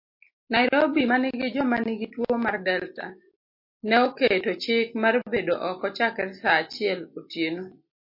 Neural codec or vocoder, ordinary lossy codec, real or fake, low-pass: none; MP3, 32 kbps; real; 5.4 kHz